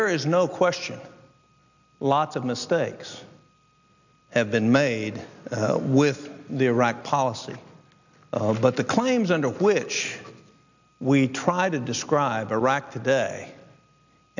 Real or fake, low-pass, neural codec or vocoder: real; 7.2 kHz; none